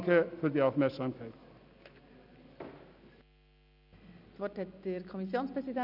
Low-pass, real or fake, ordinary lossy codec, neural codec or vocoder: 5.4 kHz; real; none; none